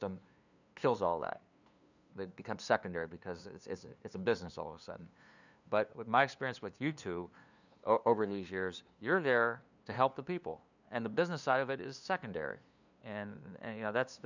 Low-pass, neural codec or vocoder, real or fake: 7.2 kHz; codec, 16 kHz, 2 kbps, FunCodec, trained on LibriTTS, 25 frames a second; fake